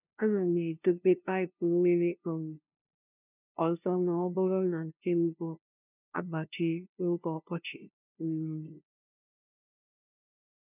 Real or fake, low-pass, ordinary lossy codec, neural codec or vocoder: fake; 3.6 kHz; none; codec, 16 kHz, 0.5 kbps, FunCodec, trained on LibriTTS, 25 frames a second